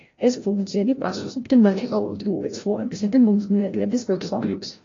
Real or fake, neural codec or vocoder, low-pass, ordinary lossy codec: fake; codec, 16 kHz, 0.5 kbps, FreqCodec, larger model; 7.2 kHz; AAC, 32 kbps